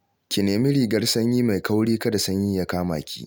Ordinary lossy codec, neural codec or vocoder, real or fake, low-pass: none; none; real; none